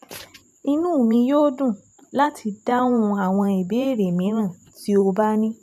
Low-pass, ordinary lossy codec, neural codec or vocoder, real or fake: 14.4 kHz; none; vocoder, 44.1 kHz, 128 mel bands every 512 samples, BigVGAN v2; fake